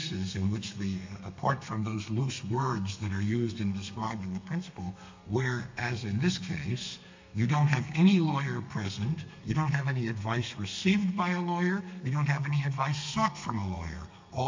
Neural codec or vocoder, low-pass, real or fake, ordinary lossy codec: codec, 32 kHz, 1.9 kbps, SNAC; 7.2 kHz; fake; MP3, 48 kbps